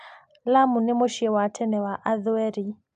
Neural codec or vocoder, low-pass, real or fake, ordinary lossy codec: none; 9.9 kHz; real; none